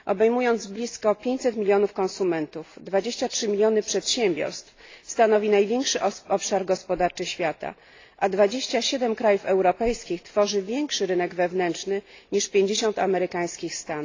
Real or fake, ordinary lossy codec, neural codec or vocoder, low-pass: real; AAC, 32 kbps; none; 7.2 kHz